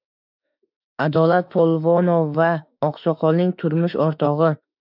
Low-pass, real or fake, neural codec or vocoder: 5.4 kHz; fake; autoencoder, 48 kHz, 32 numbers a frame, DAC-VAE, trained on Japanese speech